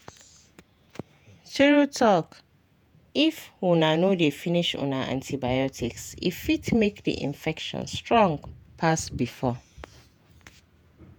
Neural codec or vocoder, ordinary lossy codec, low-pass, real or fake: vocoder, 48 kHz, 128 mel bands, Vocos; none; none; fake